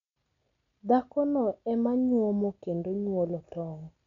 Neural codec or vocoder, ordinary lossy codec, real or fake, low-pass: none; none; real; 7.2 kHz